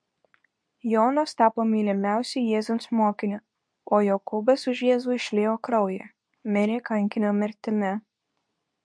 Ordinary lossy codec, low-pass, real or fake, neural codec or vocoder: MP3, 64 kbps; 9.9 kHz; fake; codec, 24 kHz, 0.9 kbps, WavTokenizer, medium speech release version 2